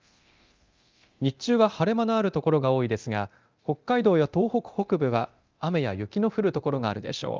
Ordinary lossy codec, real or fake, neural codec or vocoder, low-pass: Opus, 24 kbps; fake; codec, 24 kHz, 0.9 kbps, DualCodec; 7.2 kHz